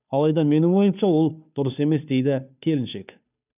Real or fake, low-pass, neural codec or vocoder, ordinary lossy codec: fake; 3.6 kHz; codec, 16 kHz, 2 kbps, FunCodec, trained on Chinese and English, 25 frames a second; none